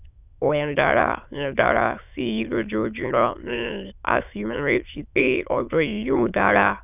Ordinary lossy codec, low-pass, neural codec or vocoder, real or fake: none; 3.6 kHz; autoencoder, 22.05 kHz, a latent of 192 numbers a frame, VITS, trained on many speakers; fake